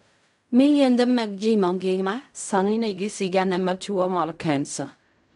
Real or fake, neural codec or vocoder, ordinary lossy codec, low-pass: fake; codec, 16 kHz in and 24 kHz out, 0.4 kbps, LongCat-Audio-Codec, fine tuned four codebook decoder; none; 10.8 kHz